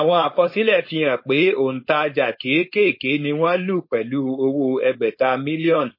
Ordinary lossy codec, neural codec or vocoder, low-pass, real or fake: MP3, 24 kbps; codec, 16 kHz, 4.8 kbps, FACodec; 5.4 kHz; fake